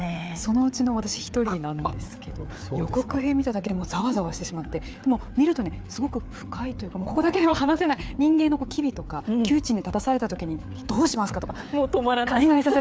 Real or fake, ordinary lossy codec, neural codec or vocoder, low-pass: fake; none; codec, 16 kHz, 4 kbps, FreqCodec, larger model; none